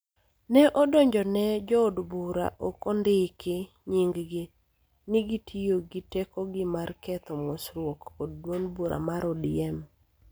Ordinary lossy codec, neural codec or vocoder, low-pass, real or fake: none; none; none; real